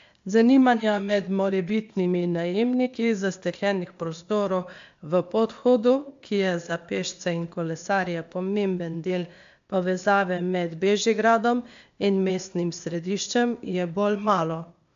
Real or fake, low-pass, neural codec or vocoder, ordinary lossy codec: fake; 7.2 kHz; codec, 16 kHz, 0.8 kbps, ZipCodec; AAC, 64 kbps